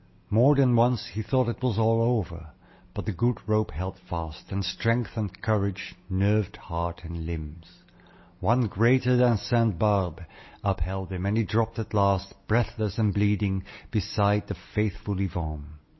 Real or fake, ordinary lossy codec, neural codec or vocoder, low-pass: real; MP3, 24 kbps; none; 7.2 kHz